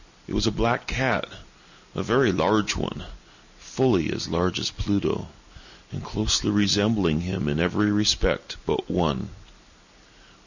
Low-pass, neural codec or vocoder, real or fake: 7.2 kHz; none; real